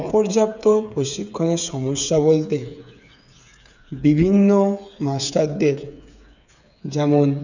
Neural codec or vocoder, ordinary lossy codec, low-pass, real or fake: codec, 16 kHz, 8 kbps, FreqCodec, smaller model; none; 7.2 kHz; fake